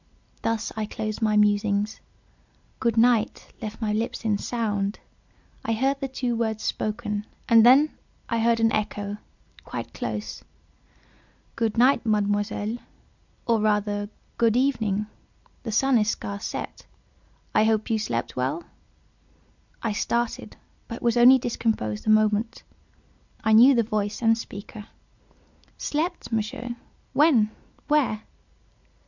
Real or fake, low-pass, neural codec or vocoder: real; 7.2 kHz; none